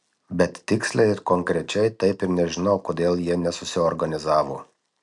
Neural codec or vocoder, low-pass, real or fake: none; 10.8 kHz; real